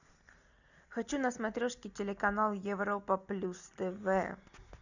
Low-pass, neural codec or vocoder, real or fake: 7.2 kHz; none; real